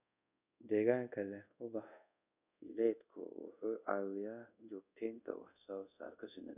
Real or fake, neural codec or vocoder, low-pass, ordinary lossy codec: fake; codec, 24 kHz, 0.5 kbps, DualCodec; 3.6 kHz; none